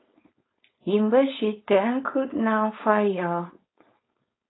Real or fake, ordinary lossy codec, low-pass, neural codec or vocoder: fake; AAC, 16 kbps; 7.2 kHz; codec, 16 kHz, 4.8 kbps, FACodec